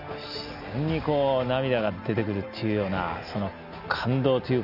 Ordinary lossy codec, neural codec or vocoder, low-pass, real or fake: none; none; 5.4 kHz; real